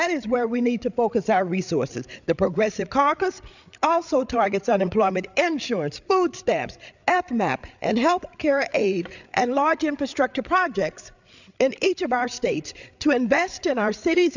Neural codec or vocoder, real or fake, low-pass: codec, 16 kHz, 8 kbps, FreqCodec, larger model; fake; 7.2 kHz